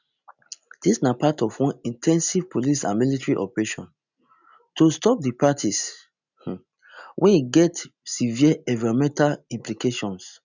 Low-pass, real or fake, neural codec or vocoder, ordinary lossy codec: 7.2 kHz; real; none; none